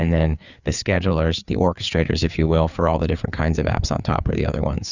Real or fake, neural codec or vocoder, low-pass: fake; codec, 16 kHz, 4 kbps, FreqCodec, larger model; 7.2 kHz